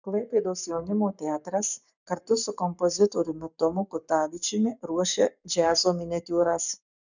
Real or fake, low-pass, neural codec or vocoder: fake; 7.2 kHz; codec, 44.1 kHz, 7.8 kbps, Pupu-Codec